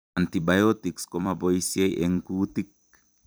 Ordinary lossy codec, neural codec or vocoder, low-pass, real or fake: none; vocoder, 44.1 kHz, 128 mel bands every 256 samples, BigVGAN v2; none; fake